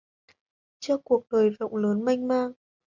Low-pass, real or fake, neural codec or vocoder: 7.2 kHz; real; none